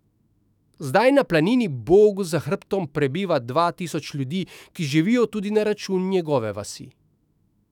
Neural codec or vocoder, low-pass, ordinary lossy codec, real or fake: autoencoder, 48 kHz, 128 numbers a frame, DAC-VAE, trained on Japanese speech; 19.8 kHz; none; fake